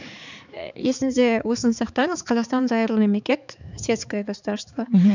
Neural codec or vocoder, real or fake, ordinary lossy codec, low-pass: codec, 16 kHz, 4 kbps, X-Codec, HuBERT features, trained on balanced general audio; fake; none; 7.2 kHz